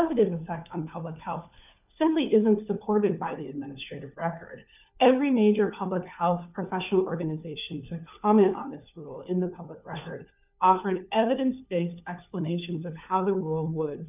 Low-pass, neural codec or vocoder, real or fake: 3.6 kHz; codec, 16 kHz, 4 kbps, FunCodec, trained on LibriTTS, 50 frames a second; fake